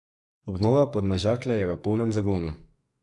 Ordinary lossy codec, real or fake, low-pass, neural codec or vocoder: MP3, 64 kbps; fake; 10.8 kHz; codec, 44.1 kHz, 2.6 kbps, SNAC